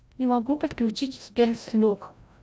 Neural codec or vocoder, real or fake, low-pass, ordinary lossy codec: codec, 16 kHz, 0.5 kbps, FreqCodec, larger model; fake; none; none